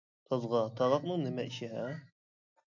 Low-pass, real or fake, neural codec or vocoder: 7.2 kHz; real; none